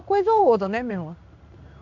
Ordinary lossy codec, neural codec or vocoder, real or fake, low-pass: none; codec, 16 kHz in and 24 kHz out, 1 kbps, XY-Tokenizer; fake; 7.2 kHz